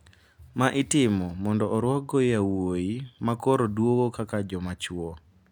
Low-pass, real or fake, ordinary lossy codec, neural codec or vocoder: 19.8 kHz; real; none; none